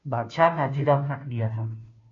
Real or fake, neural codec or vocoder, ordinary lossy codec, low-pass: fake; codec, 16 kHz, 0.5 kbps, FunCodec, trained on Chinese and English, 25 frames a second; MP3, 48 kbps; 7.2 kHz